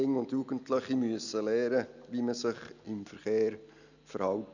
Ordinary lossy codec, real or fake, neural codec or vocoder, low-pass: none; real; none; 7.2 kHz